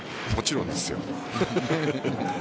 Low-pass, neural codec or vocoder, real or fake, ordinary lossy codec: none; none; real; none